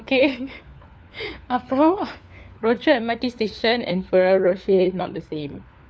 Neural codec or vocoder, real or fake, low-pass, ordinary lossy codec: codec, 16 kHz, 4 kbps, FunCodec, trained on LibriTTS, 50 frames a second; fake; none; none